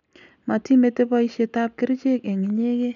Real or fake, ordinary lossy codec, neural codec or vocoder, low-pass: real; none; none; 7.2 kHz